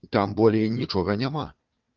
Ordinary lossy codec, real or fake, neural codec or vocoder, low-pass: Opus, 32 kbps; fake; codec, 16 kHz, 4 kbps, FunCodec, trained on Chinese and English, 50 frames a second; 7.2 kHz